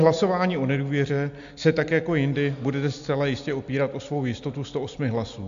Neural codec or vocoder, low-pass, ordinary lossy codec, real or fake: none; 7.2 kHz; AAC, 64 kbps; real